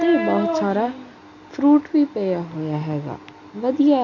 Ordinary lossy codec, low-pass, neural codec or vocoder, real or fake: none; 7.2 kHz; none; real